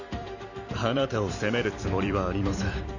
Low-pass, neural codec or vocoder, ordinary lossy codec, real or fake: 7.2 kHz; vocoder, 44.1 kHz, 128 mel bands every 256 samples, BigVGAN v2; none; fake